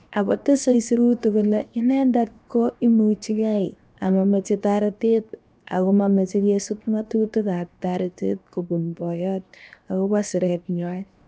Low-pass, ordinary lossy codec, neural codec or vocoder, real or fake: none; none; codec, 16 kHz, 0.7 kbps, FocalCodec; fake